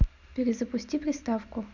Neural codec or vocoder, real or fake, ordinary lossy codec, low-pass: none; real; none; 7.2 kHz